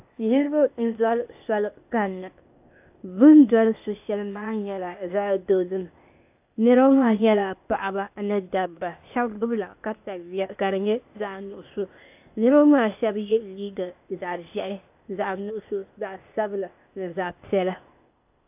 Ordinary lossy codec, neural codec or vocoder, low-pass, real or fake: AAC, 32 kbps; codec, 16 kHz, 0.8 kbps, ZipCodec; 3.6 kHz; fake